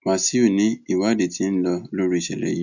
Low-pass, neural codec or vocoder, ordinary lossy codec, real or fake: 7.2 kHz; none; none; real